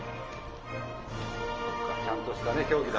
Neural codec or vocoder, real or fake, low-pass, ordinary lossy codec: none; real; 7.2 kHz; Opus, 24 kbps